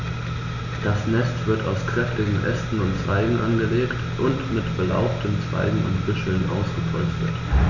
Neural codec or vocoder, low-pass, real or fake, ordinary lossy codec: none; 7.2 kHz; real; none